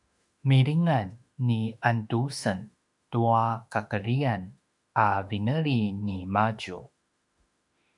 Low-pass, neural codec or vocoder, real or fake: 10.8 kHz; autoencoder, 48 kHz, 32 numbers a frame, DAC-VAE, trained on Japanese speech; fake